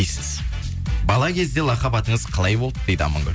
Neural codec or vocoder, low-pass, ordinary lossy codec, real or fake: none; none; none; real